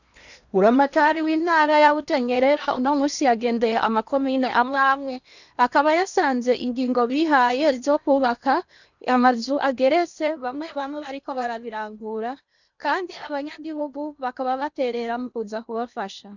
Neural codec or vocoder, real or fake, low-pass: codec, 16 kHz in and 24 kHz out, 0.8 kbps, FocalCodec, streaming, 65536 codes; fake; 7.2 kHz